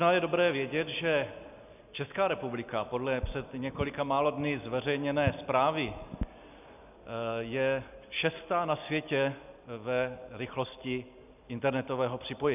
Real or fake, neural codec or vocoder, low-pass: real; none; 3.6 kHz